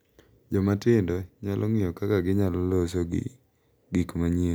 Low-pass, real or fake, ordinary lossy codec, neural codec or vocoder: none; real; none; none